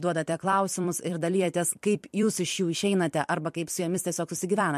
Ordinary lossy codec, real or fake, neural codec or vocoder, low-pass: MP3, 64 kbps; fake; vocoder, 44.1 kHz, 128 mel bands every 256 samples, BigVGAN v2; 14.4 kHz